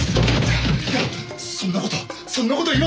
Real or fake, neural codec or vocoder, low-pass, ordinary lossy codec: real; none; none; none